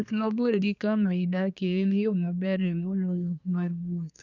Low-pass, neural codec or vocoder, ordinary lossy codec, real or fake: 7.2 kHz; codec, 24 kHz, 1 kbps, SNAC; none; fake